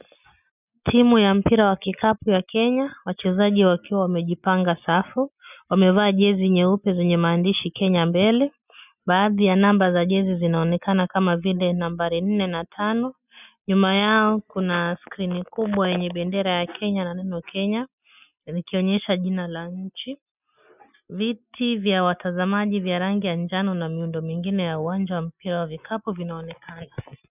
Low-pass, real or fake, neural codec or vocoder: 3.6 kHz; real; none